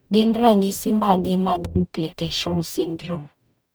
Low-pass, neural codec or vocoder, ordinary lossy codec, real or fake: none; codec, 44.1 kHz, 0.9 kbps, DAC; none; fake